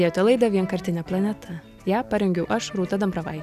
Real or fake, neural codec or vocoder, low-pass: real; none; 14.4 kHz